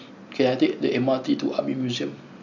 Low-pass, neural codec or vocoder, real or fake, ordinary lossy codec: 7.2 kHz; none; real; none